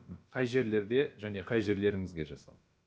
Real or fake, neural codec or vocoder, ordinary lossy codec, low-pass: fake; codec, 16 kHz, about 1 kbps, DyCAST, with the encoder's durations; none; none